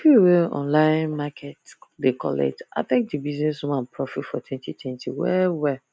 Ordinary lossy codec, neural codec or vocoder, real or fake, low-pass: none; none; real; none